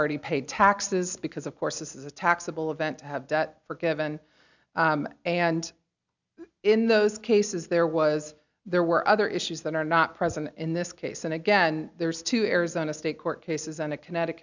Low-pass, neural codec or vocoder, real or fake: 7.2 kHz; none; real